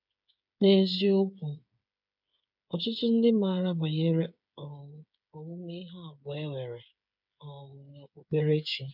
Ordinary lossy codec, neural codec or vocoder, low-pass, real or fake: none; codec, 16 kHz, 8 kbps, FreqCodec, smaller model; 5.4 kHz; fake